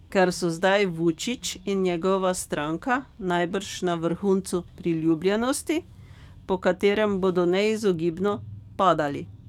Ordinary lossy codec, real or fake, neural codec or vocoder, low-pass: none; fake; codec, 44.1 kHz, 7.8 kbps, DAC; 19.8 kHz